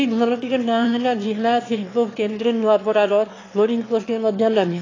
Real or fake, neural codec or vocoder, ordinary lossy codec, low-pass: fake; autoencoder, 22.05 kHz, a latent of 192 numbers a frame, VITS, trained on one speaker; AAC, 32 kbps; 7.2 kHz